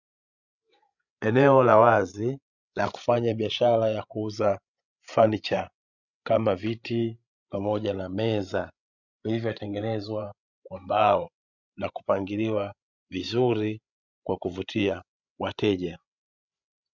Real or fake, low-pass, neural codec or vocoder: fake; 7.2 kHz; codec, 16 kHz, 16 kbps, FreqCodec, larger model